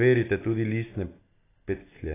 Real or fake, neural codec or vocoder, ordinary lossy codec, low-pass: real; none; AAC, 16 kbps; 3.6 kHz